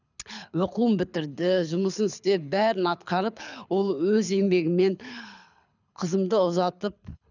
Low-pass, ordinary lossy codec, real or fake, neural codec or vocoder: 7.2 kHz; none; fake; codec, 24 kHz, 6 kbps, HILCodec